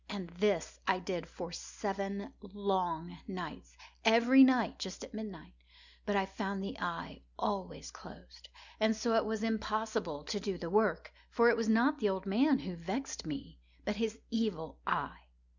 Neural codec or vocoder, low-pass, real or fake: none; 7.2 kHz; real